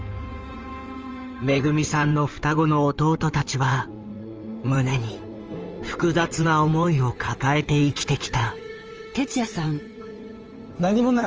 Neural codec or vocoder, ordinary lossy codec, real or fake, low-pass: codec, 16 kHz in and 24 kHz out, 2.2 kbps, FireRedTTS-2 codec; Opus, 24 kbps; fake; 7.2 kHz